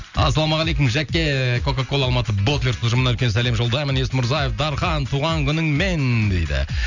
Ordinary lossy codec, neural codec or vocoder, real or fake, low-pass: none; none; real; 7.2 kHz